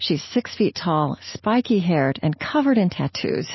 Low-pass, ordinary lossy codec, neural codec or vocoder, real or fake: 7.2 kHz; MP3, 24 kbps; none; real